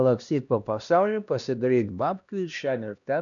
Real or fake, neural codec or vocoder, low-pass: fake; codec, 16 kHz, 1 kbps, X-Codec, HuBERT features, trained on LibriSpeech; 7.2 kHz